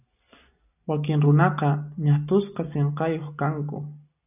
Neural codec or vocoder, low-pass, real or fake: none; 3.6 kHz; real